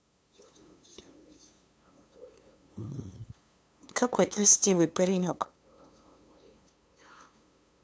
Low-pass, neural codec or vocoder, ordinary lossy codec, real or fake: none; codec, 16 kHz, 2 kbps, FunCodec, trained on LibriTTS, 25 frames a second; none; fake